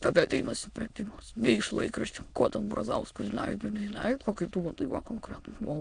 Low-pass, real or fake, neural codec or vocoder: 9.9 kHz; fake; autoencoder, 22.05 kHz, a latent of 192 numbers a frame, VITS, trained on many speakers